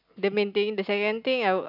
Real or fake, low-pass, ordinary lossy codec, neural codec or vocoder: real; 5.4 kHz; none; none